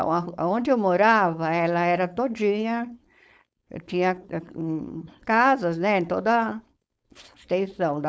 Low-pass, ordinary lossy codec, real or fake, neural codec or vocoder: none; none; fake; codec, 16 kHz, 4.8 kbps, FACodec